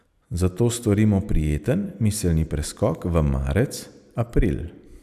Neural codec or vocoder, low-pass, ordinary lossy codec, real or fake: none; 14.4 kHz; none; real